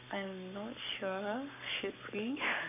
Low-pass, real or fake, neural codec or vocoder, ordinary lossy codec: 3.6 kHz; fake; codec, 44.1 kHz, 7.8 kbps, Pupu-Codec; none